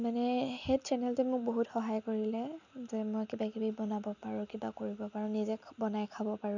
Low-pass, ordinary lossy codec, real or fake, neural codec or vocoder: 7.2 kHz; none; real; none